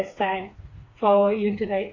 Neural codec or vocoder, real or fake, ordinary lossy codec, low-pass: codec, 16 kHz, 4 kbps, FreqCodec, smaller model; fake; AAC, 32 kbps; 7.2 kHz